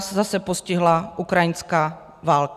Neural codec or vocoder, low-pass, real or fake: none; 14.4 kHz; real